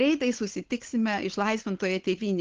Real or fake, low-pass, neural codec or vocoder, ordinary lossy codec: fake; 7.2 kHz; codec, 16 kHz, 8 kbps, FunCodec, trained on Chinese and English, 25 frames a second; Opus, 16 kbps